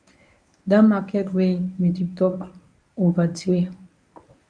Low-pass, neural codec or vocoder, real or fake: 9.9 kHz; codec, 24 kHz, 0.9 kbps, WavTokenizer, medium speech release version 1; fake